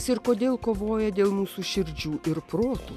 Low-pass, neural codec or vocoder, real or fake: 14.4 kHz; none; real